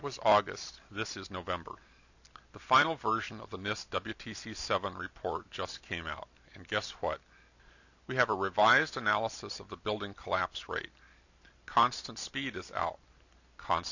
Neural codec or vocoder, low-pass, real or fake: none; 7.2 kHz; real